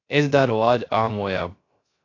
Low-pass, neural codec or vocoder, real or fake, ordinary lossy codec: 7.2 kHz; codec, 16 kHz, 0.3 kbps, FocalCodec; fake; AAC, 32 kbps